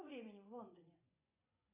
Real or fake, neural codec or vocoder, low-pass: fake; vocoder, 24 kHz, 100 mel bands, Vocos; 3.6 kHz